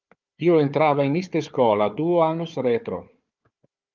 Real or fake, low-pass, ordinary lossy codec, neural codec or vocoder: fake; 7.2 kHz; Opus, 24 kbps; codec, 16 kHz, 4 kbps, FunCodec, trained on Chinese and English, 50 frames a second